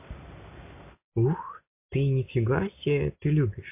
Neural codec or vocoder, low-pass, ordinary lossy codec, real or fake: none; 3.6 kHz; MP3, 24 kbps; real